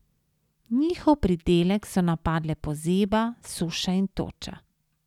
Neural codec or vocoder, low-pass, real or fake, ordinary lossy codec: none; 19.8 kHz; real; none